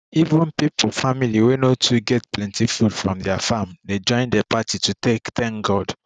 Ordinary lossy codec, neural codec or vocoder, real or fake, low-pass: none; none; real; 9.9 kHz